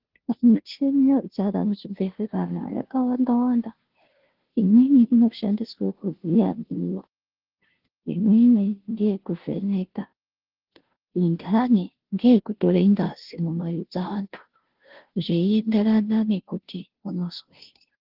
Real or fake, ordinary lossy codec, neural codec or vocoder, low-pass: fake; Opus, 16 kbps; codec, 16 kHz, 0.5 kbps, FunCodec, trained on Chinese and English, 25 frames a second; 5.4 kHz